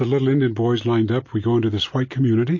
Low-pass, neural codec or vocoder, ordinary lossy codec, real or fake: 7.2 kHz; none; MP3, 32 kbps; real